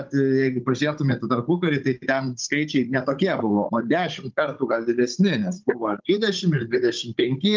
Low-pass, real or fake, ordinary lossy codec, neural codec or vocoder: 7.2 kHz; fake; Opus, 32 kbps; codec, 16 kHz, 16 kbps, FunCodec, trained on Chinese and English, 50 frames a second